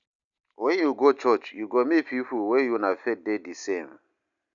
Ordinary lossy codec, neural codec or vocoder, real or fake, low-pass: none; none; real; 7.2 kHz